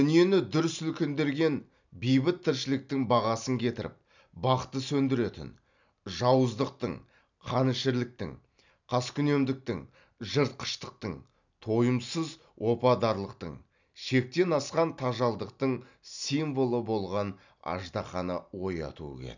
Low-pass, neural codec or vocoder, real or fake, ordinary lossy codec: 7.2 kHz; none; real; none